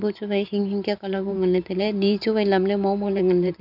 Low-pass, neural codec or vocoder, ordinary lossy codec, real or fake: 5.4 kHz; vocoder, 44.1 kHz, 128 mel bands every 512 samples, BigVGAN v2; none; fake